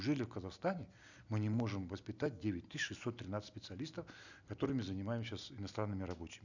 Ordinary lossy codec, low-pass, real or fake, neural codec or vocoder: none; 7.2 kHz; real; none